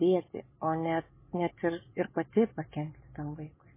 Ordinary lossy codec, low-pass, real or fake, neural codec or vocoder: MP3, 16 kbps; 3.6 kHz; real; none